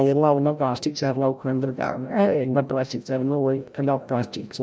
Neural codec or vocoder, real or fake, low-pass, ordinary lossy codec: codec, 16 kHz, 0.5 kbps, FreqCodec, larger model; fake; none; none